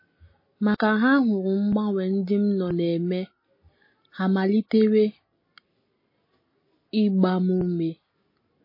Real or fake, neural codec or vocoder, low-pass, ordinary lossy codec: real; none; 5.4 kHz; MP3, 24 kbps